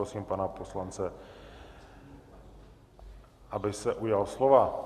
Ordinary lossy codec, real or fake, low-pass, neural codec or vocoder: Opus, 64 kbps; real; 14.4 kHz; none